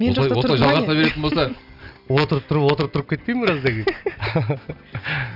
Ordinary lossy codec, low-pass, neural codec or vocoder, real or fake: none; 5.4 kHz; none; real